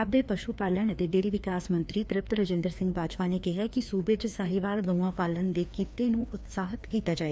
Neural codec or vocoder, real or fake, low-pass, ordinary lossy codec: codec, 16 kHz, 2 kbps, FreqCodec, larger model; fake; none; none